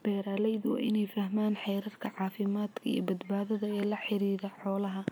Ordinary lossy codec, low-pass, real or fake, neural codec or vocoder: none; none; real; none